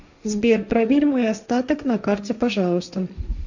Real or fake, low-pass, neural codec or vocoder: fake; 7.2 kHz; codec, 16 kHz, 1.1 kbps, Voila-Tokenizer